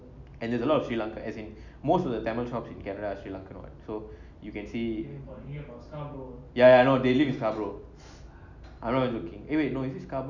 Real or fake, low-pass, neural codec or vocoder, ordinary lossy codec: real; 7.2 kHz; none; none